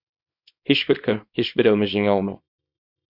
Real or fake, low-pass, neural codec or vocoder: fake; 5.4 kHz; codec, 24 kHz, 0.9 kbps, WavTokenizer, small release